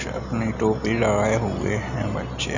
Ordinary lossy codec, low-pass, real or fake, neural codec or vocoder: none; 7.2 kHz; real; none